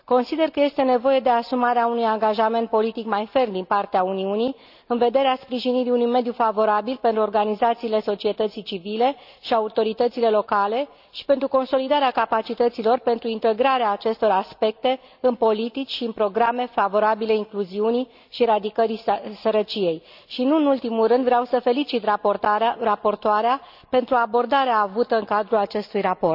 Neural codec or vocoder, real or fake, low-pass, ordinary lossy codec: none; real; 5.4 kHz; none